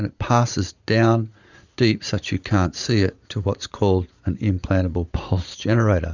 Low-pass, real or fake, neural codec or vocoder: 7.2 kHz; fake; vocoder, 44.1 kHz, 80 mel bands, Vocos